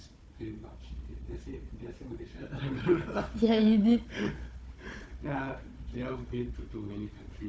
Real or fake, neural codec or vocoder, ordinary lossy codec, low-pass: fake; codec, 16 kHz, 4 kbps, FunCodec, trained on Chinese and English, 50 frames a second; none; none